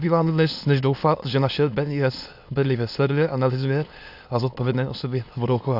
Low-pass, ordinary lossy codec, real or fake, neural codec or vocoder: 5.4 kHz; AAC, 48 kbps; fake; autoencoder, 22.05 kHz, a latent of 192 numbers a frame, VITS, trained on many speakers